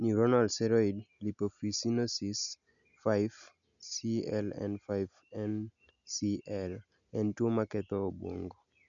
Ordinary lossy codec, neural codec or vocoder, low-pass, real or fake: none; none; 7.2 kHz; real